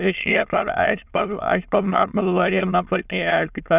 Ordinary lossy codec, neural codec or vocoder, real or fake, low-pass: none; autoencoder, 22.05 kHz, a latent of 192 numbers a frame, VITS, trained on many speakers; fake; 3.6 kHz